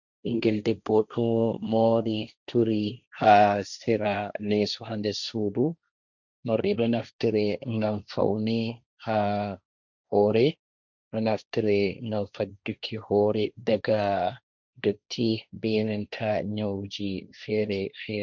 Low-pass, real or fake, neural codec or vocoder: 7.2 kHz; fake; codec, 16 kHz, 1.1 kbps, Voila-Tokenizer